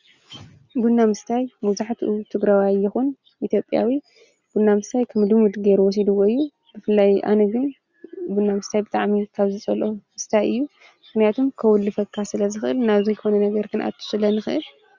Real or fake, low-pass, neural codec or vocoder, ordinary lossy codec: real; 7.2 kHz; none; Opus, 64 kbps